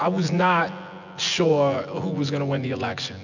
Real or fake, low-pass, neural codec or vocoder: fake; 7.2 kHz; vocoder, 24 kHz, 100 mel bands, Vocos